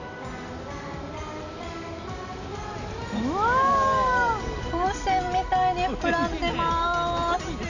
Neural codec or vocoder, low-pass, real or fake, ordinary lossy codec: none; 7.2 kHz; real; none